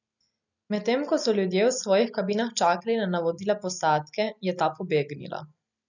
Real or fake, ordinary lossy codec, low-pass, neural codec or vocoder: real; none; 7.2 kHz; none